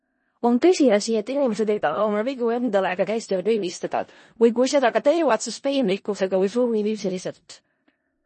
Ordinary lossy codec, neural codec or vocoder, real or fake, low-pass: MP3, 32 kbps; codec, 16 kHz in and 24 kHz out, 0.4 kbps, LongCat-Audio-Codec, four codebook decoder; fake; 10.8 kHz